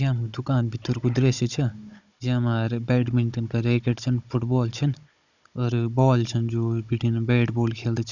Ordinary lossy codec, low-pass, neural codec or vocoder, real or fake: none; 7.2 kHz; codec, 16 kHz, 16 kbps, FunCodec, trained on Chinese and English, 50 frames a second; fake